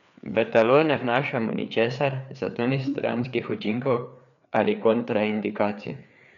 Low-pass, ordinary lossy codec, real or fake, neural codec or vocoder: 7.2 kHz; none; fake; codec, 16 kHz, 4 kbps, FreqCodec, larger model